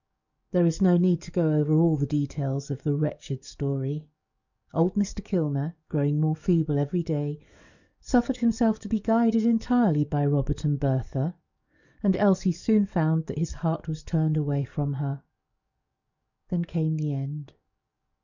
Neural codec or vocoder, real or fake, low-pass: codec, 44.1 kHz, 7.8 kbps, DAC; fake; 7.2 kHz